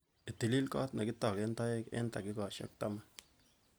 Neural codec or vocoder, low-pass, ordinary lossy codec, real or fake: none; none; none; real